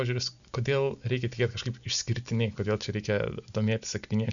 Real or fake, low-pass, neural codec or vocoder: real; 7.2 kHz; none